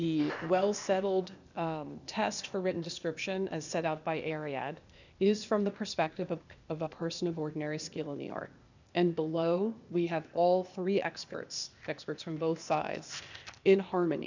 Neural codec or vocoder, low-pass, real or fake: codec, 16 kHz, 0.8 kbps, ZipCodec; 7.2 kHz; fake